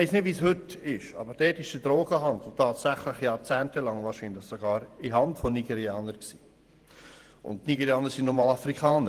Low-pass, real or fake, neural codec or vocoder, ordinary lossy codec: 14.4 kHz; fake; vocoder, 48 kHz, 128 mel bands, Vocos; Opus, 24 kbps